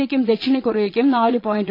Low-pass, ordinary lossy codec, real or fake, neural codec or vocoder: 5.4 kHz; AAC, 32 kbps; fake; vocoder, 22.05 kHz, 80 mel bands, Vocos